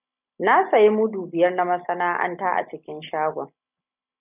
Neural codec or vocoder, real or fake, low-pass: none; real; 3.6 kHz